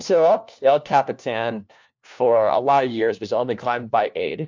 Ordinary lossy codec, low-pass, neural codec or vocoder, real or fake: MP3, 64 kbps; 7.2 kHz; codec, 16 kHz, 1 kbps, FunCodec, trained on LibriTTS, 50 frames a second; fake